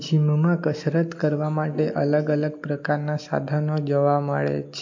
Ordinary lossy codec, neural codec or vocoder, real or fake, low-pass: MP3, 48 kbps; none; real; 7.2 kHz